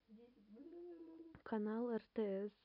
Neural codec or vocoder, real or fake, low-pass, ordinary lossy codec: none; real; 5.4 kHz; none